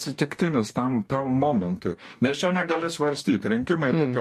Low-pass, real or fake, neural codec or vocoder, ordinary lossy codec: 14.4 kHz; fake; codec, 44.1 kHz, 2.6 kbps, DAC; MP3, 64 kbps